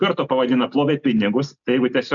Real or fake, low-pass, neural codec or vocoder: real; 7.2 kHz; none